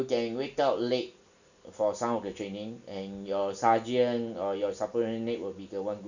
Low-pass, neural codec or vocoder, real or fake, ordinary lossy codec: 7.2 kHz; none; real; none